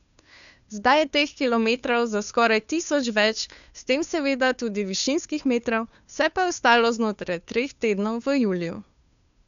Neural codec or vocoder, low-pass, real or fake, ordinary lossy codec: codec, 16 kHz, 2 kbps, FunCodec, trained on Chinese and English, 25 frames a second; 7.2 kHz; fake; none